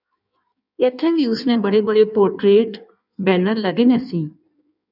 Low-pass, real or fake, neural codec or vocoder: 5.4 kHz; fake; codec, 16 kHz in and 24 kHz out, 1.1 kbps, FireRedTTS-2 codec